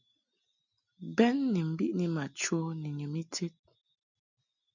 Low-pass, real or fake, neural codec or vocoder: 7.2 kHz; real; none